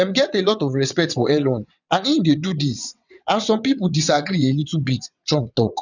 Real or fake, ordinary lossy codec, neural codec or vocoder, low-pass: fake; none; vocoder, 22.05 kHz, 80 mel bands, WaveNeXt; 7.2 kHz